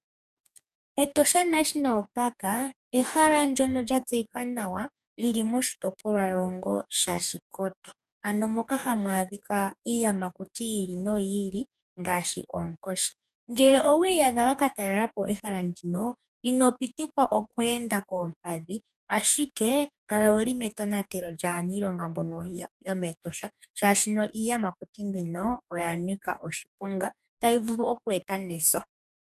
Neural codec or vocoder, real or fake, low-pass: codec, 44.1 kHz, 2.6 kbps, DAC; fake; 14.4 kHz